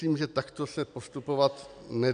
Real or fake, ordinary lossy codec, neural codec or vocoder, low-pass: real; AAC, 96 kbps; none; 9.9 kHz